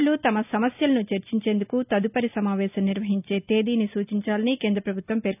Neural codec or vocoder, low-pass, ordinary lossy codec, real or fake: none; 3.6 kHz; none; real